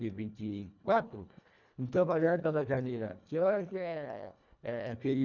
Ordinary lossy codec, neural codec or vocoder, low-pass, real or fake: none; codec, 24 kHz, 1.5 kbps, HILCodec; 7.2 kHz; fake